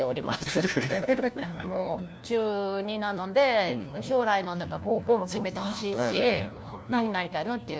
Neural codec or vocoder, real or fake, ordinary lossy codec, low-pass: codec, 16 kHz, 1 kbps, FunCodec, trained on LibriTTS, 50 frames a second; fake; none; none